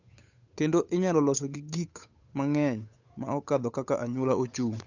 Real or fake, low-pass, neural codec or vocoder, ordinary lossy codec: fake; 7.2 kHz; codec, 16 kHz, 8 kbps, FunCodec, trained on Chinese and English, 25 frames a second; none